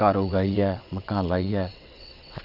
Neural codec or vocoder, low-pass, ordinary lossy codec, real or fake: vocoder, 22.05 kHz, 80 mel bands, WaveNeXt; 5.4 kHz; AAC, 48 kbps; fake